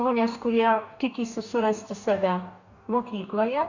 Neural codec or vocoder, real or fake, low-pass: codec, 44.1 kHz, 2.6 kbps, DAC; fake; 7.2 kHz